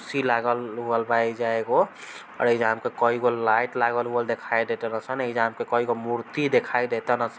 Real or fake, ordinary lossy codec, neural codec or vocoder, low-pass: real; none; none; none